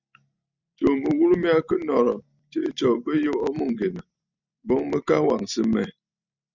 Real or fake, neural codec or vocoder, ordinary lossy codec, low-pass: real; none; Opus, 64 kbps; 7.2 kHz